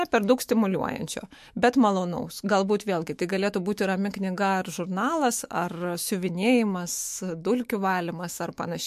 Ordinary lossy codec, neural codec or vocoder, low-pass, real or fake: MP3, 64 kbps; autoencoder, 48 kHz, 128 numbers a frame, DAC-VAE, trained on Japanese speech; 14.4 kHz; fake